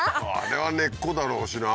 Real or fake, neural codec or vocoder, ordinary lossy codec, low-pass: real; none; none; none